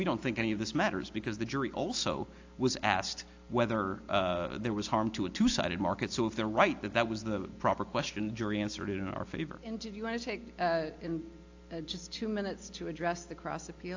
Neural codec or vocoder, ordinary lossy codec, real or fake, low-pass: none; AAC, 48 kbps; real; 7.2 kHz